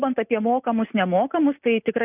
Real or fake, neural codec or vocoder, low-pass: real; none; 3.6 kHz